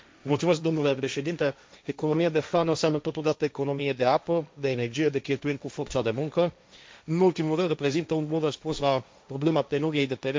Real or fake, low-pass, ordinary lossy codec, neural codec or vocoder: fake; none; none; codec, 16 kHz, 1.1 kbps, Voila-Tokenizer